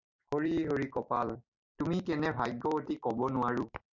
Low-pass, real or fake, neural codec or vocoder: 7.2 kHz; fake; vocoder, 44.1 kHz, 128 mel bands every 256 samples, BigVGAN v2